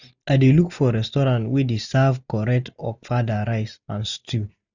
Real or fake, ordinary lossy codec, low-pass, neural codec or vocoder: real; none; 7.2 kHz; none